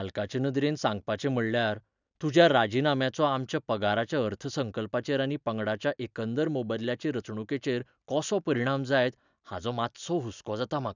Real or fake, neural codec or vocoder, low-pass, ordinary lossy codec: real; none; 7.2 kHz; none